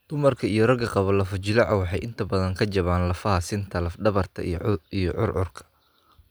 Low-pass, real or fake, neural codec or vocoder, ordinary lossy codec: none; real; none; none